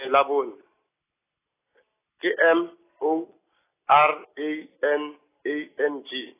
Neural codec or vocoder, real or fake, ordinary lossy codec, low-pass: none; real; AAC, 16 kbps; 3.6 kHz